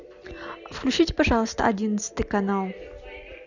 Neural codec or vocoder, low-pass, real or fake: none; 7.2 kHz; real